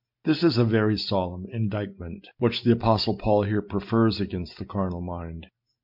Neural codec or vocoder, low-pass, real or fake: none; 5.4 kHz; real